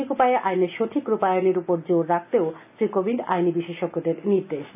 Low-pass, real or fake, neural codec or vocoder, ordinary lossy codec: 3.6 kHz; real; none; AAC, 32 kbps